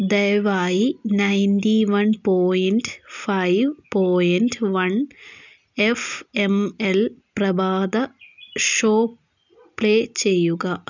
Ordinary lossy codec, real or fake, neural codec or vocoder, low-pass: none; real; none; 7.2 kHz